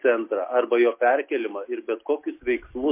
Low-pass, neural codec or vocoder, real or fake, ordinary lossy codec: 3.6 kHz; none; real; MP3, 24 kbps